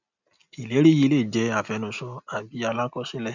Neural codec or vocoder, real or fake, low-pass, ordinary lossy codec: none; real; 7.2 kHz; none